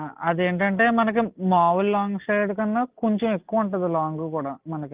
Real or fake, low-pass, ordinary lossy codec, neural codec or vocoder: real; 3.6 kHz; Opus, 32 kbps; none